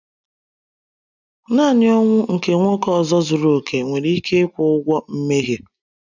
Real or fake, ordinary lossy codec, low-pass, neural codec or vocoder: real; none; 7.2 kHz; none